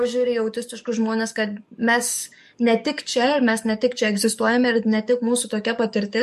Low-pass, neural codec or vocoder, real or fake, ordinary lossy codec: 14.4 kHz; codec, 44.1 kHz, 7.8 kbps, DAC; fake; MP3, 64 kbps